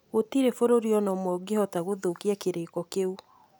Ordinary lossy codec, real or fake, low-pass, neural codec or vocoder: none; real; none; none